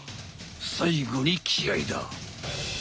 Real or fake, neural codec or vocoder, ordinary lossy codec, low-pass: real; none; none; none